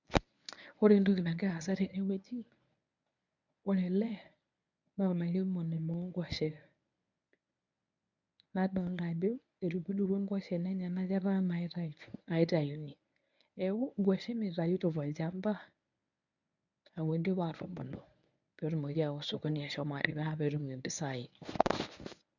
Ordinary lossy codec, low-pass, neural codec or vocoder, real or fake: none; 7.2 kHz; codec, 24 kHz, 0.9 kbps, WavTokenizer, medium speech release version 1; fake